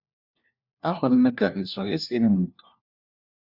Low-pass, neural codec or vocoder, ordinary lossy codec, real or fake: 5.4 kHz; codec, 16 kHz, 1 kbps, FunCodec, trained on LibriTTS, 50 frames a second; Opus, 64 kbps; fake